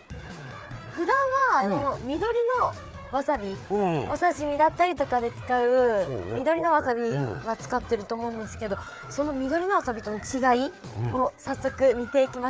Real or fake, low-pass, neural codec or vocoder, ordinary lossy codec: fake; none; codec, 16 kHz, 4 kbps, FreqCodec, larger model; none